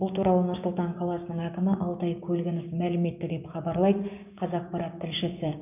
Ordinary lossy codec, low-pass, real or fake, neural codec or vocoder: none; 3.6 kHz; fake; codec, 16 kHz, 6 kbps, DAC